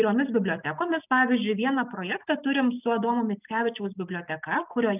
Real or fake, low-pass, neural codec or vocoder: real; 3.6 kHz; none